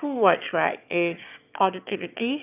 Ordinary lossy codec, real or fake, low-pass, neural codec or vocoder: none; fake; 3.6 kHz; autoencoder, 22.05 kHz, a latent of 192 numbers a frame, VITS, trained on one speaker